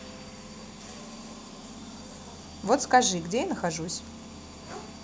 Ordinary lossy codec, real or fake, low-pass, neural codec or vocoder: none; real; none; none